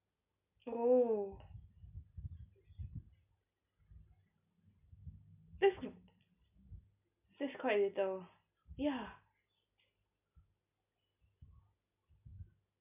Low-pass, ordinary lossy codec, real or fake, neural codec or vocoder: 3.6 kHz; none; real; none